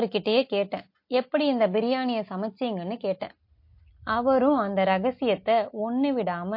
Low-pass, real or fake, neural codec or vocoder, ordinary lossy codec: 5.4 kHz; real; none; MP3, 32 kbps